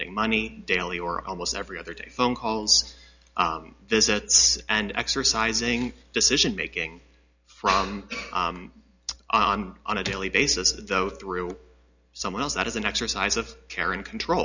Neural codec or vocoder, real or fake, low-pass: none; real; 7.2 kHz